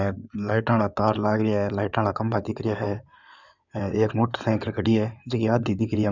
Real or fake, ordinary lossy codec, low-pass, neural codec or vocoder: fake; none; 7.2 kHz; codec, 16 kHz in and 24 kHz out, 2.2 kbps, FireRedTTS-2 codec